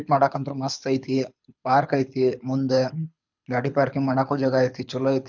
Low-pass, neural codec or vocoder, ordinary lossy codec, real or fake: 7.2 kHz; codec, 24 kHz, 6 kbps, HILCodec; none; fake